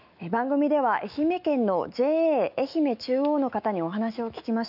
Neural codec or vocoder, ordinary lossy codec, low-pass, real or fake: autoencoder, 48 kHz, 128 numbers a frame, DAC-VAE, trained on Japanese speech; none; 5.4 kHz; fake